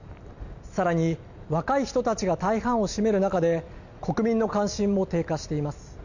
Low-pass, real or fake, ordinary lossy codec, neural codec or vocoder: 7.2 kHz; real; none; none